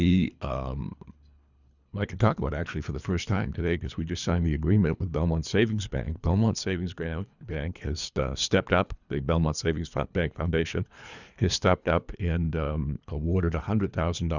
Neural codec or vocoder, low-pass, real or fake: codec, 24 kHz, 3 kbps, HILCodec; 7.2 kHz; fake